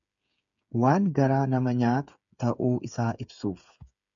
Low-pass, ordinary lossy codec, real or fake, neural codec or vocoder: 7.2 kHz; MP3, 96 kbps; fake; codec, 16 kHz, 8 kbps, FreqCodec, smaller model